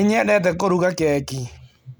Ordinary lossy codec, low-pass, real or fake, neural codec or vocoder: none; none; real; none